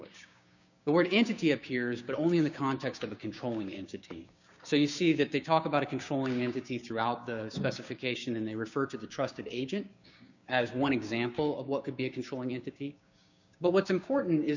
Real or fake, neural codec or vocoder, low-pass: fake; codec, 16 kHz, 6 kbps, DAC; 7.2 kHz